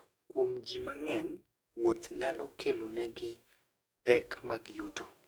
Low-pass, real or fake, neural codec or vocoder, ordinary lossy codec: none; fake; codec, 44.1 kHz, 2.6 kbps, DAC; none